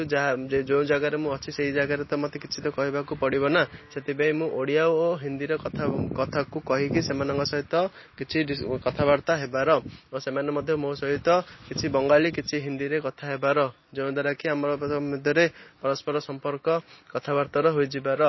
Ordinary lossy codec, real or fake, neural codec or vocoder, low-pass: MP3, 24 kbps; real; none; 7.2 kHz